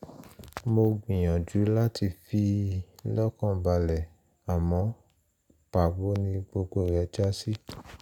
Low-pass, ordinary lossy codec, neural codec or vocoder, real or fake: 19.8 kHz; none; none; real